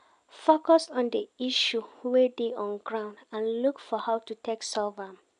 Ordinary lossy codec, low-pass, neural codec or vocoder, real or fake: none; 9.9 kHz; none; real